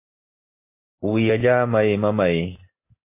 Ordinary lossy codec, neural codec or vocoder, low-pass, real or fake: MP3, 24 kbps; none; 3.6 kHz; real